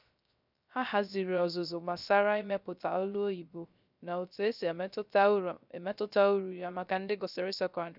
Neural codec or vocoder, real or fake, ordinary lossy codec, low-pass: codec, 16 kHz, 0.3 kbps, FocalCodec; fake; none; 5.4 kHz